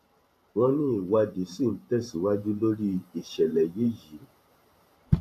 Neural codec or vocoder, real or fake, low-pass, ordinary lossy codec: vocoder, 44.1 kHz, 128 mel bands every 512 samples, BigVGAN v2; fake; 14.4 kHz; AAC, 64 kbps